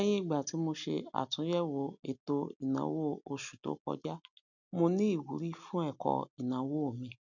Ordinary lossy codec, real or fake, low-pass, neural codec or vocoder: none; real; 7.2 kHz; none